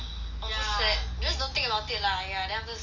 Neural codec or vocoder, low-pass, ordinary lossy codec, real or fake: none; 7.2 kHz; none; real